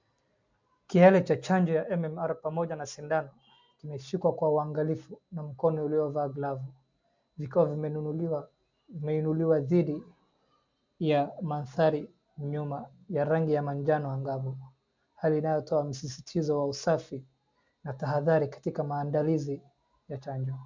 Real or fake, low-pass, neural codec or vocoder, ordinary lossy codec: real; 7.2 kHz; none; MP3, 64 kbps